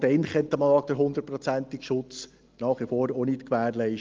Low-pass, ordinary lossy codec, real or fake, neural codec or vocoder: 7.2 kHz; Opus, 24 kbps; real; none